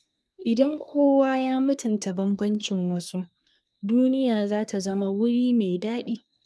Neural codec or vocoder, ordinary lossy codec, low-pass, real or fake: codec, 24 kHz, 1 kbps, SNAC; none; none; fake